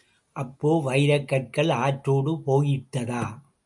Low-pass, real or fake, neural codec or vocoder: 10.8 kHz; real; none